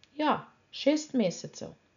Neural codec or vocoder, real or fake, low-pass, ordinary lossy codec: none; real; 7.2 kHz; none